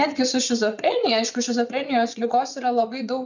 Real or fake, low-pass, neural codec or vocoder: fake; 7.2 kHz; vocoder, 22.05 kHz, 80 mel bands, WaveNeXt